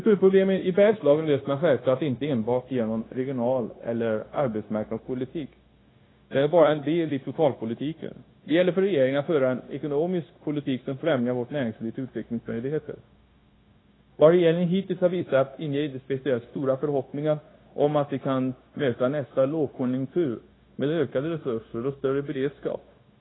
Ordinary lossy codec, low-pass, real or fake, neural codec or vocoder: AAC, 16 kbps; 7.2 kHz; fake; codec, 16 kHz, 0.9 kbps, LongCat-Audio-Codec